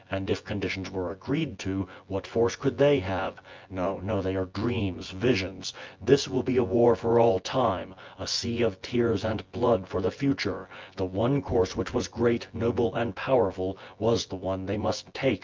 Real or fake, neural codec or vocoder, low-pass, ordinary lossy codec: fake; vocoder, 24 kHz, 100 mel bands, Vocos; 7.2 kHz; Opus, 32 kbps